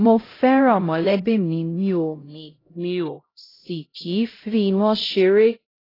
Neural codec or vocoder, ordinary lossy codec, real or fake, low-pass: codec, 16 kHz, 0.5 kbps, X-Codec, HuBERT features, trained on LibriSpeech; AAC, 24 kbps; fake; 5.4 kHz